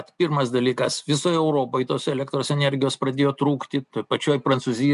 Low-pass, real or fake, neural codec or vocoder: 10.8 kHz; real; none